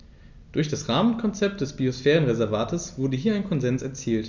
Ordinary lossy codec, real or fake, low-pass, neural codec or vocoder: none; real; 7.2 kHz; none